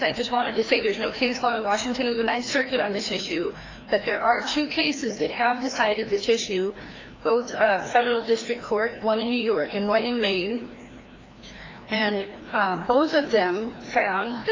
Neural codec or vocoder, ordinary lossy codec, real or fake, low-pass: codec, 16 kHz, 1 kbps, FreqCodec, larger model; AAC, 32 kbps; fake; 7.2 kHz